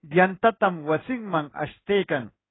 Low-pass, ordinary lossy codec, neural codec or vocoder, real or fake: 7.2 kHz; AAC, 16 kbps; codec, 16 kHz in and 24 kHz out, 1 kbps, XY-Tokenizer; fake